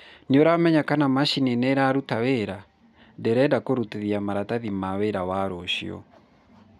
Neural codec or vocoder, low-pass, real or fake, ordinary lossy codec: none; 14.4 kHz; real; none